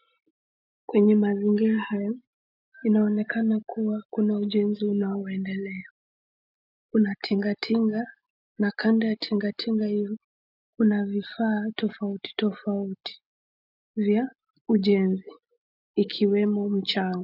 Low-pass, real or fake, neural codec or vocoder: 5.4 kHz; real; none